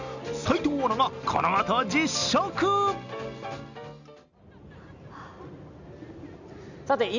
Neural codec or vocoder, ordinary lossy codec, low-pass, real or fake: none; none; 7.2 kHz; real